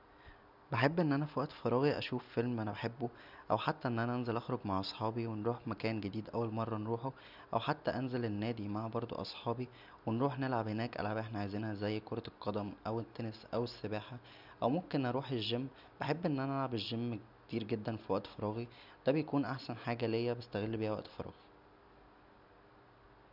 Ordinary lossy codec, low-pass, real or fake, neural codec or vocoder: none; 5.4 kHz; real; none